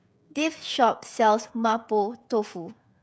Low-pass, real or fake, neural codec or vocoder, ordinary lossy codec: none; fake; codec, 16 kHz, 16 kbps, FreqCodec, smaller model; none